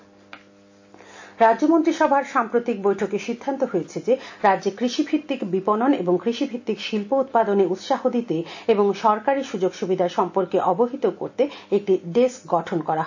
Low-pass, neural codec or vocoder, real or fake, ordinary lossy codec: 7.2 kHz; none; real; AAC, 48 kbps